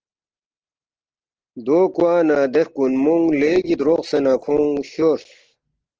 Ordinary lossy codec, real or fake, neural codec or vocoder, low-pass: Opus, 16 kbps; real; none; 7.2 kHz